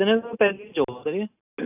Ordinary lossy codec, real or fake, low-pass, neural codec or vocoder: none; real; 3.6 kHz; none